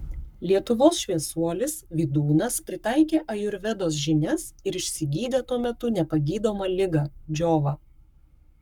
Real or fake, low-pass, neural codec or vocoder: fake; 19.8 kHz; codec, 44.1 kHz, 7.8 kbps, Pupu-Codec